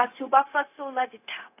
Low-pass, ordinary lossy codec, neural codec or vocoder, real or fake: 3.6 kHz; AAC, 24 kbps; codec, 16 kHz, 0.4 kbps, LongCat-Audio-Codec; fake